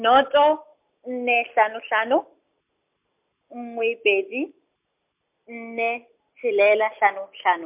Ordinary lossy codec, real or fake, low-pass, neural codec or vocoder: MP3, 32 kbps; real; 3.6 kHz; none